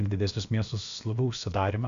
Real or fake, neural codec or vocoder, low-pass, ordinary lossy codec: fake; codec, 16 kHz, about 1 kbps, DyCAST, with the encoder's durations; 7.2 kHz; Opus, 64 kbps